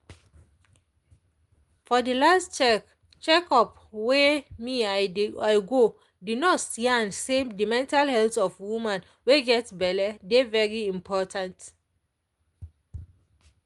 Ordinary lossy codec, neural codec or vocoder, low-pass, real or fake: Opus, 32 kbps; none; 10.8 kHz; real